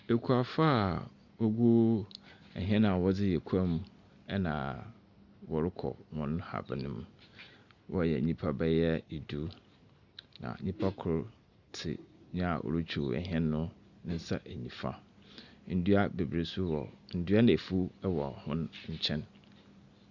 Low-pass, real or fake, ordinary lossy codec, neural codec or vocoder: 7.2 kHz; real; Opus, 64 kbps; none